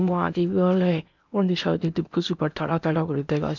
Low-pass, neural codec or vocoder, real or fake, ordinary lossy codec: 7.2 kHz; codec, 16 kHz in and 24 kHz out, 0.8 kbps, FocalCodec, streaming, 65536 codes; fake; none